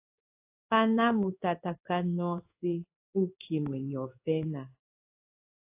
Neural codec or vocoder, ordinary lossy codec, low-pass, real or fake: codec, 16 kHz in and 24 kHz out, 1 kbps, XY-Tokenizer; AAC, 32 kbps; 3.6 kHz; fake